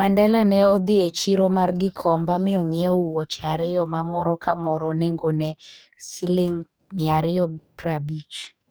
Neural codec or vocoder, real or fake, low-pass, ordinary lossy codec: codec, 44.1 kHz, 2.6 kbps, DAC; fake; none; none